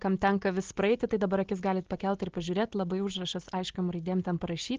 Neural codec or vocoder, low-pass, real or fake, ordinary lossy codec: none; 7.2 kHz; real; Opus, 16 kbps